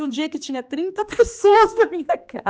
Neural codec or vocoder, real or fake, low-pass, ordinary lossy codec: codec, 16 kHz, 2 kbps, X-Codec, HuBERT features, trained on general audio; fake; none; none